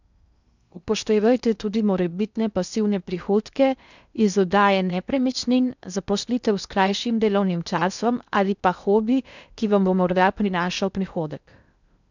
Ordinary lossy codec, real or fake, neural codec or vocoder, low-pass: none; fake; codec, 16 kHz in and 24 kHz out, 0.6 kbps, FocalCodec, streaming, 2048 codes; 7.2 kHz